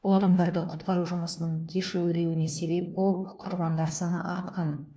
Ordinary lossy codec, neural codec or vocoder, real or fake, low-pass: none; codec, 16 kHz, 1 kbps, FunCodec, trained on LibriTTS, 50 frames a second; fake; none